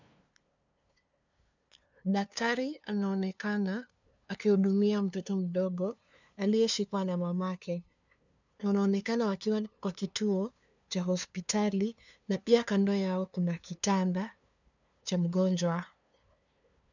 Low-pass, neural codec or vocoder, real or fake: 7.2 kHz; codec, 16 kHz, 2 kbps, FunCodec, trained on LibriTTS, 25 frames a second; fake